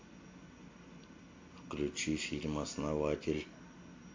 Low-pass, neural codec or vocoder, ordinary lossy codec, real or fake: 7.2 kHz; none; MP3, 48 kbps; real